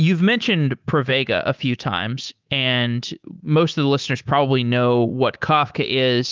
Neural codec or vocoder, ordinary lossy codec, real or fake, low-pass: codec, 24 kHz, 3.1 kbps, DualCodec; Opus, 32 kbps; fake; 7.2 kHz